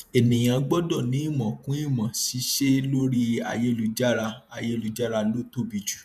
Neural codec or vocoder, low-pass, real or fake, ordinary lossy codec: vocoder, 48 kHz, 128 mel bands, Vocos; 14.4 kHz; fake; none